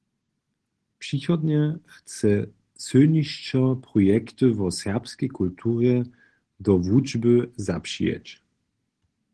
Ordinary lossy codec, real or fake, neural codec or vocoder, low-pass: Opus, 16 kbps; real; none; 10.8 kHz